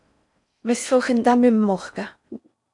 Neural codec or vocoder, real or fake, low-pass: codec, 16 kHz in and 24 kHz out, 0.6 kbps, FocalCodec, streaming, 4096 codes; fake; 10.8 kHz